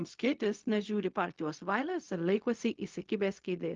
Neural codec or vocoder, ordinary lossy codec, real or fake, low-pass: codec, 16 kHz, 0.4 kbps, LongCat-Audio-Codec; Opus, 24 kbps; fake; 7.2 kHz